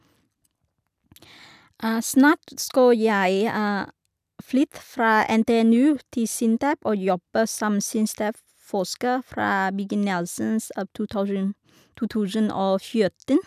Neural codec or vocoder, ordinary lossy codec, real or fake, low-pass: none; none; real; 14.4 kHz